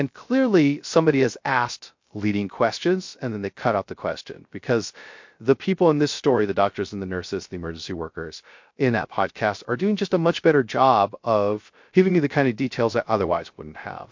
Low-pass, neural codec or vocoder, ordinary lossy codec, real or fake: 7.2 kHz; codec, 16 kHz, 0.3 kbps, FocalCodec; MP3, 48 kbps; fake